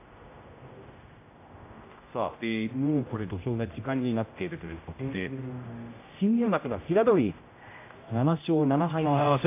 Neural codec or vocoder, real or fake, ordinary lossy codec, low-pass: codec, 16 kHz, 0.5 kbps, X-Codec, HuBERT features, trained on general audio; fake; AAC, 24 kbps; 3.6 kHz